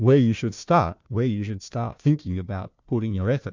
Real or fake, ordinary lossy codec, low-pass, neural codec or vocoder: fake; MP3, 64 kbps; 7.2 kHz; codec, 16 kHz, 1 kbps, FunCodec, trained on Chinese and English, 50 frames a second